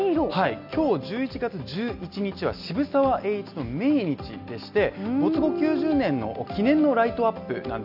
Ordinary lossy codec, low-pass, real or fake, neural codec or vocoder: none; 5.4 kHz; real; none